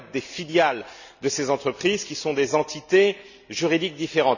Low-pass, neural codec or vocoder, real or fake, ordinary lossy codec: 7.2 kHz; none; real; none